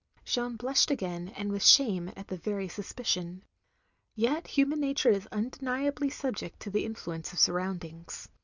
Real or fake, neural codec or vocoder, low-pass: real; none; 7.2 kHz